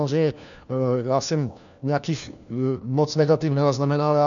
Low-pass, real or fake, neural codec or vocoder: 7.2 kHz; fake; codec, 16 kHz, 1 kbps, FunCodec, trained on LibriTTS, 50 frames a second